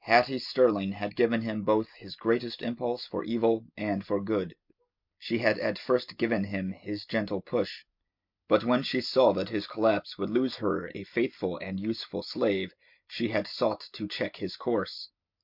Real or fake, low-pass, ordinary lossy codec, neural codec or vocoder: real; 5.4 kHz; MP3, 48 kbps; none